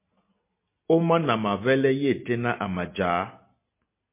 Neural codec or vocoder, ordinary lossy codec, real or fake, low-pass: none; MP3, 24 kbps; real; 3.6 kHz